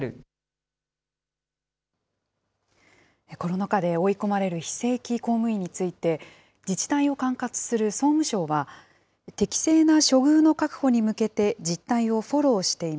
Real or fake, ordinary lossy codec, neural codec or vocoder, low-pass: real; none; none; none